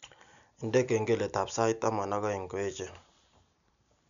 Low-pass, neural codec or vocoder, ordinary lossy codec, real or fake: 7.2 kHz; none; none; real